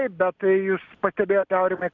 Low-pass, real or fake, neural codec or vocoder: 7.2 kHz; real; none